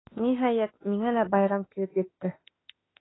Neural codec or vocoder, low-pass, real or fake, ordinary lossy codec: autoencoder, 48 kHz, 32 numbers a frame, DAC-VAE, trained on Japanese speech; 7.2 kHz; fake; AAC, 16 kbps